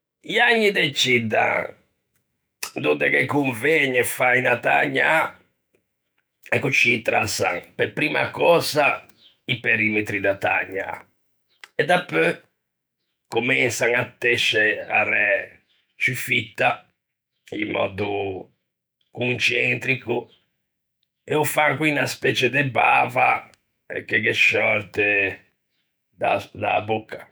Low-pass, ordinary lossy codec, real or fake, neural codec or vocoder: none; none; fake; autoencoder, 48 kHz, 128 numbers a frame, DAC-VAE, trained on Japanese speech